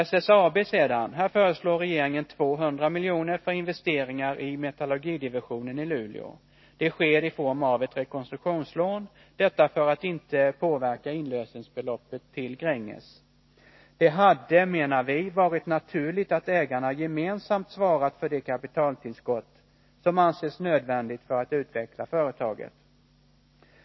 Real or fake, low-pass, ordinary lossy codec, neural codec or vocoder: real; 7.2 kHz; MP3, 24 kbps; none